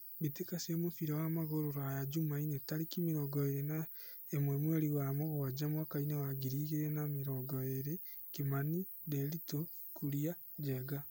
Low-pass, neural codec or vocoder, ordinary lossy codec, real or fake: none; none; none; real